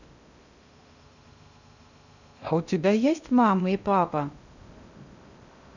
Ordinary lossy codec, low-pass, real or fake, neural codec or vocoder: none; 7.2 kHz; fake; codec, 16 kHz in and 24 kHz out, 0.6 kbps, FocalCodec, streaming, 2048 codes